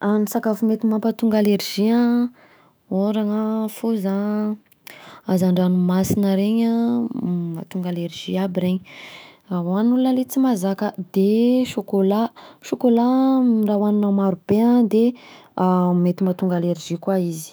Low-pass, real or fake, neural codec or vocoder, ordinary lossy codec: none; fake; autoencoder, 48 kHz, 128 numbers a frame, DAC-VAE, trained on Japanese speech; none